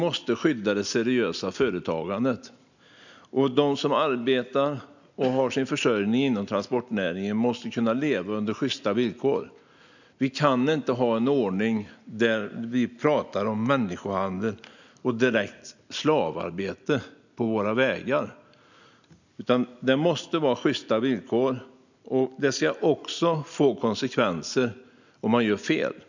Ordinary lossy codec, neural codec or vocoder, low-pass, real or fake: none; none; 7.2 kHz; real